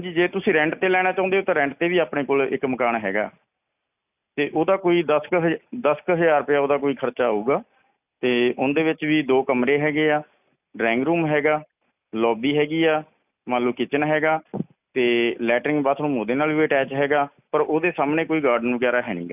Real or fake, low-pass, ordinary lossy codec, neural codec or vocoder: real; 3.6 kHz; none; none